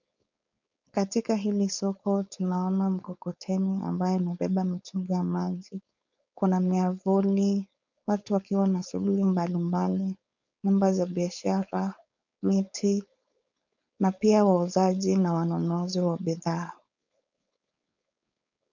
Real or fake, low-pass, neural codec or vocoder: fake; 7.2 kHz; codec, 16 kHz, 4.8 kbps, FACodec